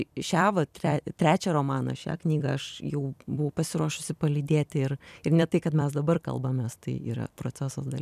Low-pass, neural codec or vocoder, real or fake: 14.4 kHz; vocoder, 44.1 kHz, 128 mel bands every 256 samples, BigVGAN v2; fake